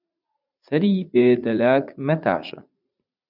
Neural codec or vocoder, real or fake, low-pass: vocoder, 44.1 kHz, 80 mel bands, Vocos; fake; 5.4 kHz